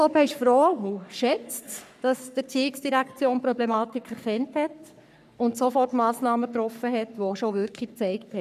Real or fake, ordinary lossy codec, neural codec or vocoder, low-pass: fake; none; codec, 44.1 kHz, 3.4 kbps, Pupu-Codec; 14.4 kHz